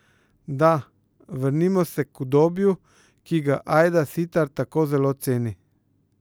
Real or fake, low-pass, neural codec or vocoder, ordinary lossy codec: real; none; none; none